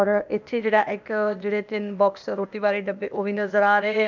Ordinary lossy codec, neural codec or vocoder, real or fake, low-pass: none; codec, 16 kHz, 0.8 kbps, ZipCodec; fake; 7.2 kHz